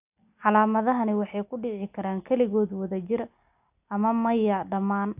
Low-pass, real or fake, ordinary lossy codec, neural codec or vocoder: 3.6 kHz; real; AAC, 32 kbps; none